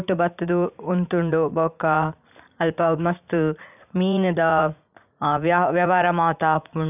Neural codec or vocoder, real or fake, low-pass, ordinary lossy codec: vocoder, 44.1 kHz, 80 mel bands, Vocos; fake; 3.6 kHz; none